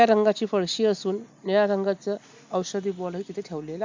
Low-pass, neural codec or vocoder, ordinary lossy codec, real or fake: 7.2 kHz; none; MP3, 64 kbps; real